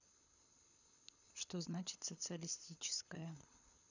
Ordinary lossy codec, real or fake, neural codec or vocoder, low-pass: none; fake; codec, 24 kHz, 6 kbps, HILCodec; 7.2 kHz